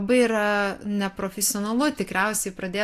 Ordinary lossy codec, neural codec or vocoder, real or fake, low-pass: AAC, 64 kbps; none; real; 14.4 kHz